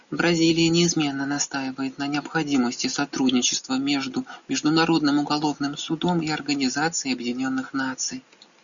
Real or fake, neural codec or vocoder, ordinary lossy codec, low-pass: real; none; MP3, 96 kbps; 7.2 kHz